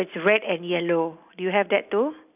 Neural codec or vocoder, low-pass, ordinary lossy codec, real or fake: none; 3.6 kHz; none; real